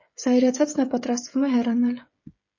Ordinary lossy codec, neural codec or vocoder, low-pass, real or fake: MP3, 32 kbps; codec, 16 kHz, 16 kbps, FreqCodec, smaller model; 7.2 kHz; fake